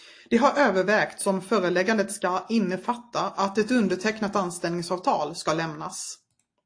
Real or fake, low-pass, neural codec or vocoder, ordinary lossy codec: real; 9.9 kHz; none; AAC, 48 kbps